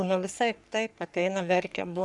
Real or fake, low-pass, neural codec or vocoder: fake; 10.8 kHz; codec, 44.1 kHz, 3.4 kbps, Pupu-Codec